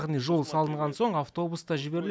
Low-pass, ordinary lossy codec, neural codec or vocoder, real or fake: none; none; none; real